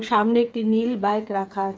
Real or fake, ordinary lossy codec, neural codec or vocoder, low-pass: fake; none; codec, 16 kHz, 8 kbps, FreqCodec, smaller model; none